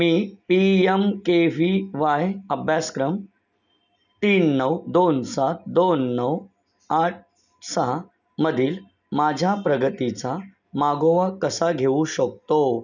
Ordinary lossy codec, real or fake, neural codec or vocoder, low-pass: none; real; none; 7.2 kHz